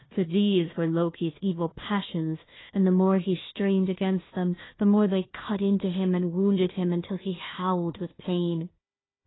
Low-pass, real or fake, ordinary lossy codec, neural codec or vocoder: 7.2 kHz; fake; AAC, 16 kbps; codec, 16 kHz, 1 kbps, FunCodec, trained on Chinese and English, 50 frames a second